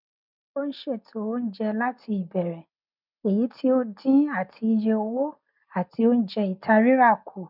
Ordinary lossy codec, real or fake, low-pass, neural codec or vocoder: none; real; 5.4 kHz; none